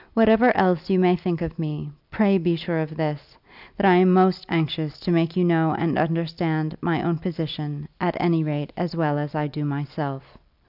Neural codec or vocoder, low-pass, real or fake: none; 5.4 kHz; real